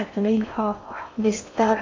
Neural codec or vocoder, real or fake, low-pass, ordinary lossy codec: codec, 16 kHz in and 24 kHz out, 0.6 kbps, FocalCodec, streaming, 4096 codes; fake; 7.2 kHz; MP3, 48 kbps